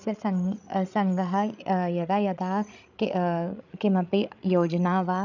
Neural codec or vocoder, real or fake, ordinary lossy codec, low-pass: codec, 16 kHz, 8 kbps, FreqCodec, larger model; fake; none; 7.2 kHz